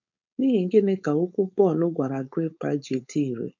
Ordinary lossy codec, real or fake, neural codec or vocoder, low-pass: MP3, 64 kbps; fake; codec, 16 kHz, 4.8 kbps, FACodec; 7.2 kHz